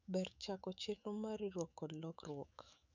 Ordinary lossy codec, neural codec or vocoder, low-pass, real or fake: none; autoencoder, 48 kHz, 128 numbers a frame, DAC-VAE, trained on Japanese speech; 7.2 kHz; fake